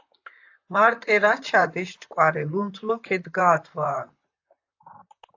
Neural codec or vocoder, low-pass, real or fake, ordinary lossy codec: vocoder, 44.1 kHz, 128 mel bands, Pupu-Vocoder; 7.2 kHz; fake; AAC, 48 kbps